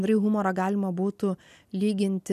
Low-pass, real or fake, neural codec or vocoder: 14.4 kHz; real; none